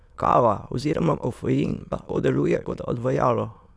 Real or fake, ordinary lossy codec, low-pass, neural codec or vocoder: fake; none; none; autoencoder, 22.05 kHz, a latent of 192 numbers a frame, VITS, trained on many speakers